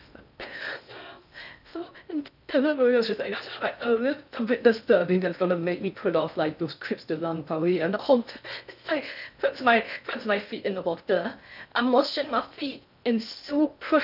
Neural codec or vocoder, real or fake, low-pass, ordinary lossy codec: codec, 16 kHz in and 24 kHz out, 0.6 kbps, FocalCodec, streaming, 2048 codes; fake; 5.4 kHz; none